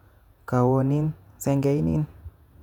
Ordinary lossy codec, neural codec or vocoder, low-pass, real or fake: none; vocoder, 48 kHz, 128 mel bands, Vocos; 19.8 kHz; fake